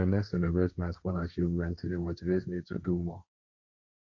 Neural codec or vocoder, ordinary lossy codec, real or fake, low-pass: codec, 16 kHz, 1.1 kbps, Voila-Tokenizer; MP3, 64 kbps; fake; 7.2 kHz